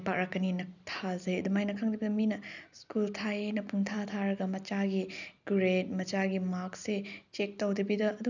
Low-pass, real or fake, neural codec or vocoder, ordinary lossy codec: 7.2 kHz; real; none; none